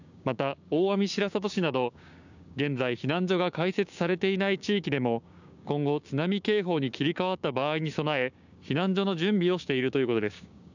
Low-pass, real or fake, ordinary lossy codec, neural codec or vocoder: 7.2 kHz; fake; none; codec, 16 kHz, 6 kbps, DAC